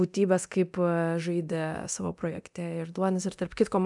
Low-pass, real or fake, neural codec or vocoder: 10.8 kHz; fake; codec, 24 kHz, 0.9 kbps, DualCodec